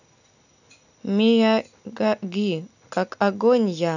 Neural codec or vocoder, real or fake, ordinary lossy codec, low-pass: none; real; none; 7.2 kHz